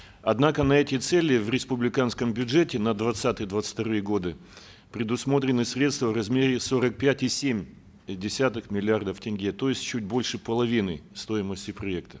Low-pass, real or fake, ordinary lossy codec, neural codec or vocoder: none; real; none; none